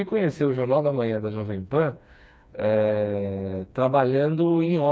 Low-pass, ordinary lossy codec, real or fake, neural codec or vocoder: none; none; fake; codec, 16 kHz, 2 kbps, FreqCodec, smaller model